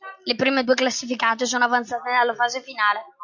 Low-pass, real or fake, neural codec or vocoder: 7.2 kHz; real; none